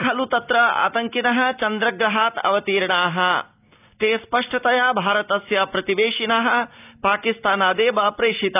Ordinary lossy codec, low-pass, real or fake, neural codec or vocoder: none; 3.6 kHz; real; none